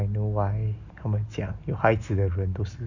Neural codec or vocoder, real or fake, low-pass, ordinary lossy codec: none; real; 7.2 kHz; none